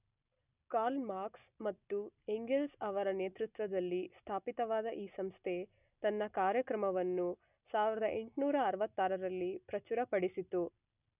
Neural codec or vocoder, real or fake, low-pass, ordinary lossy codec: none; real; 3.6 kHz; none